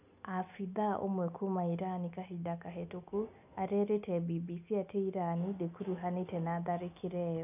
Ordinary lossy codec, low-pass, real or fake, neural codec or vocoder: none; 3.6 kHz; real; none